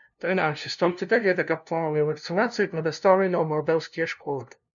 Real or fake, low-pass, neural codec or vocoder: fake; 7.2 kHz; codec, 16 kHz, 0.5 kbps, FunCodec, trained on LibriTTS, 25 frames a second